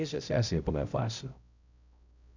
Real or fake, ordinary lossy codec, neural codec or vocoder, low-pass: fake; none; codec, 16 kHz, 0.5 kbps, X-Codec, HuBERT features, trained on balanced general audio; 7.2 kHz